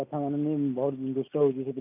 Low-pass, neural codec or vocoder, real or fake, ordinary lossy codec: 3.6 kHz; none; real; none